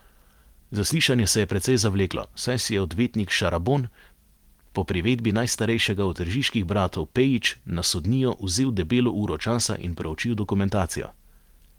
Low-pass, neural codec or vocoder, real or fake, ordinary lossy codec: 19.8 kHz; none; real; Opus, 24 kbps